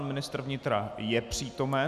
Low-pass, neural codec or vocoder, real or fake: 14.4 kHz; none; real